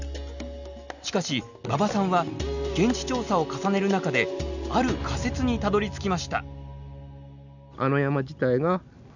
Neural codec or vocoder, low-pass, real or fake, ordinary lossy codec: none; 7.2 kHz; real; none